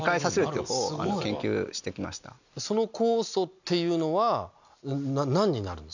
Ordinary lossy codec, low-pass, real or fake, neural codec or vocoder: none; 7.2 kHz; real; none